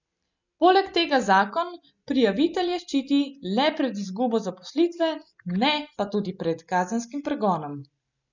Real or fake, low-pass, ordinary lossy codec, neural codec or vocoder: real; 7.2 kHz; none; none